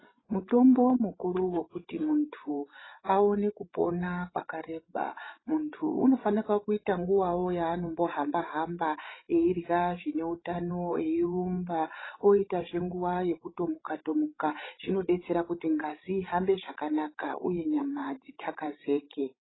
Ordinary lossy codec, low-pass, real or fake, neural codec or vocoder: AAC, 16 kbps; 7.2 kHz; fake; codec, 16 kHz, 16 kbps, FreqCodec, larger model